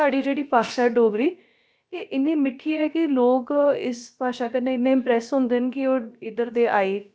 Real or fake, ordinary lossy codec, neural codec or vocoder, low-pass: fake; none; codec, 16 kHz, about 1 kbps, DyCAST, with the encoder's durations; none